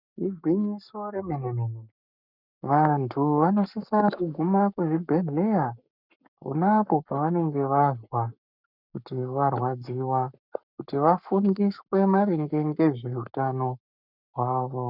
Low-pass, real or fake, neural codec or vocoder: 5.4 kHz; fake; codec, 44.1 kHz, 7.8 kbps, Pupu-Codec